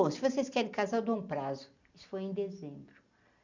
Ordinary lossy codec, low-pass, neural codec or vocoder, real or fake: none; 7.2 kHz; none; real